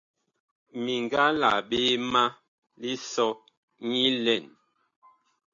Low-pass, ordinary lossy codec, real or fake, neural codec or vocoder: 7.2 kHz; AAC, 64 kbps; real; none